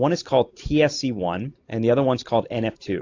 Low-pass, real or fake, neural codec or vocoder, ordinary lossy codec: 7.2 kHz; real; none; AAC, 48 kbps